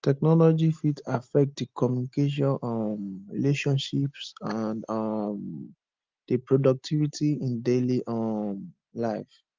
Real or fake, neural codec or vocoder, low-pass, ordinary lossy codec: real; none; 7.2 kHz; Opus, 32 kbps